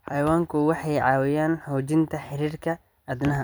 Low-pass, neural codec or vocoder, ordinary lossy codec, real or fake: none; vocoder, 44.1 kHz, 128 mel bands every 256 samples, BigVGAN v2; none; fake